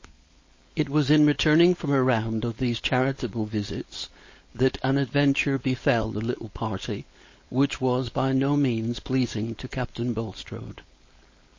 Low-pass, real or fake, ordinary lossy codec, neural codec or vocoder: 7.2 kHz; fake; MP3, 32 kbps; codec, 16 kHz, 4.8 kbps, FACodec